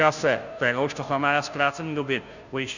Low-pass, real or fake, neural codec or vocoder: 7.2 kHz; fake; codec, 16 kHz, 0.5 kbps, FunCodec, trained on Chinese and English, 25 frames a second